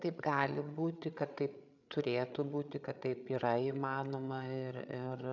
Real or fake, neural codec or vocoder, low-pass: fake; codec, 16 kHz, 8 kbps, FreqCodec, larger model; 7.2 kHz